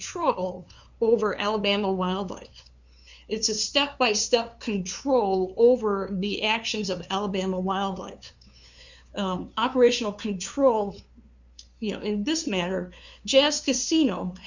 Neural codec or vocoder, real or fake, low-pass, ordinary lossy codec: codec, 16 kHz, 2 kbps, FunCodec, trained on LibriTTS, 25 frames a second; fake; 7.2 kHz; Opus, 64 kbps